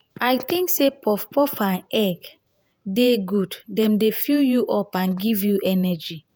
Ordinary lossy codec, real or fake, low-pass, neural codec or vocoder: none; fake; none; vocoder, 48 kHz, 128 mel bands, Vocos